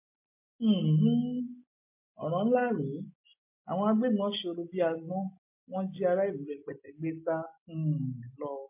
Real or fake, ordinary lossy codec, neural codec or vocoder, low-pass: real; MP3, 24 kbps; none; 3.6 kHz